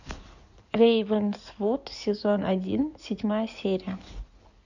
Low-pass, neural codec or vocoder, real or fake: 7.2 kHz; codec, 16 kHz in and 24 kHz out, 2.2 kbps, FireRedTTS-2 codec; fake